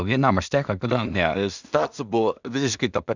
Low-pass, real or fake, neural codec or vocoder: 7.2 kHz; fake; codec, 16 kHz in and 24 kHz out, 0.4 kbps, LongCat-Audio-Codec, two codebook decoder